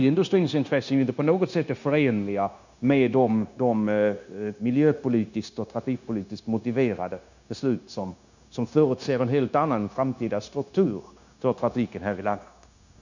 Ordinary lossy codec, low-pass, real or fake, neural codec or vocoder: none; 7.2 kHz; fake; codec, 16 kHz, 0.9 kbps, LongCat-Audio-Codec